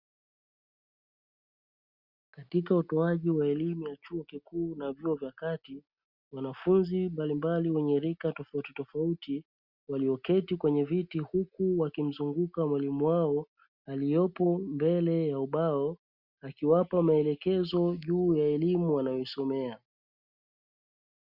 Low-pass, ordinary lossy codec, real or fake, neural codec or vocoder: 5.4 kHz; Opus, 64 kbps; real; none